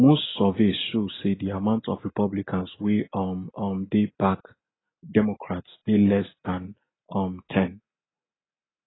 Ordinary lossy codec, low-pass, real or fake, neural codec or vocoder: AAC, 16 kbps; 7.2 kHz; real; none